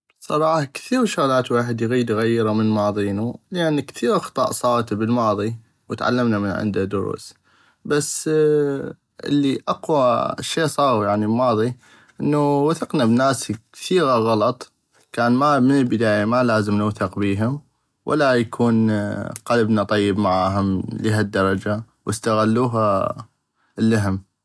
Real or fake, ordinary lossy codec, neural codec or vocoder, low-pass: real; none; none; none